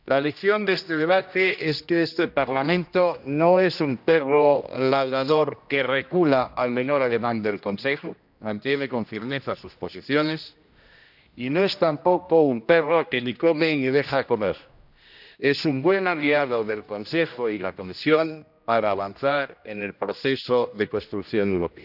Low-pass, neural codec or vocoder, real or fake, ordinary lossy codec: 5.4 kHz; codec, 16 kHz, 1 kbps, X-Codec, HuBERT features, trained on general audio; fake; none